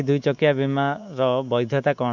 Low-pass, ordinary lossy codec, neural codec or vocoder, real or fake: 7.2 kHz; none; codec, 24 kHz, 3.1 kbps, DualCodec; fake